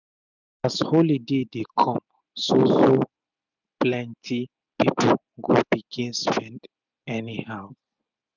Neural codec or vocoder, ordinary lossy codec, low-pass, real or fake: none; none; 7.2 kHz; real